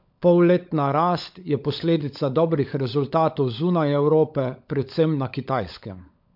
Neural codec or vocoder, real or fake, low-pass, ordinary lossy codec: codec, 16 kHz, 16 kbps, FunCodec, trained on LibriTTS, 50 frames a second; fake; 5.4 kHz; MP3, 48 kbps